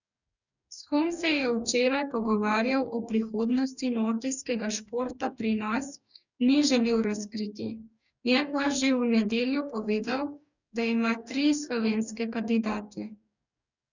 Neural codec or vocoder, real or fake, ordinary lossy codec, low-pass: codec, 44.1 kHz, 2.6 kbps, DAC; fake; none; 7.2 kHz